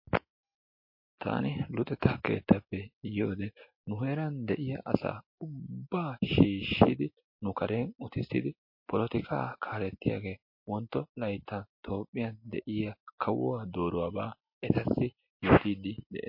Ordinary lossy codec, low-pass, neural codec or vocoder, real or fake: MP3, 32 kbps; 5.4 kHz; none; real